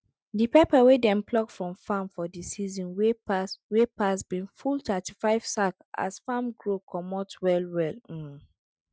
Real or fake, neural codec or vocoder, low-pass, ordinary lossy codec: real; none; none; none